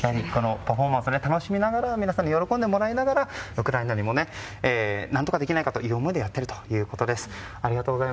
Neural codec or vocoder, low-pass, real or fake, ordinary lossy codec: none; none; real; none